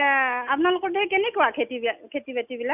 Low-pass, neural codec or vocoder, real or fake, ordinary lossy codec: 3.6 kHz; none; real; none